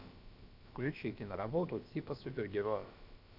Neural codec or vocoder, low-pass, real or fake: codec, 16 kHz, about 1 kbps, DyCAST, with the encoder's durations; 5.4 kHz; fake